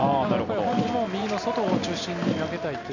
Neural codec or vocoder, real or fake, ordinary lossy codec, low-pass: none; real; none; 7.2 kHz